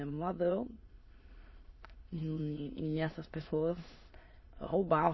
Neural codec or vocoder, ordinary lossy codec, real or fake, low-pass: autoencoder, 22.05 kHz, a latent of 192 numbers a frame, VITS, trained on many speakers; MP3, 24 kbps; fake; 7.2 kHz